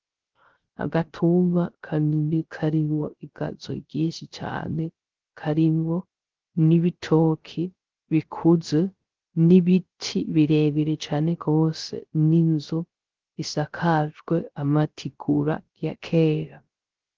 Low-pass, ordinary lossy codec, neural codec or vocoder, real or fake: 7.2 kHz; Opus, 16 kbps; codec, 16 kHz, 0.3 kbps, FocalCodec; fake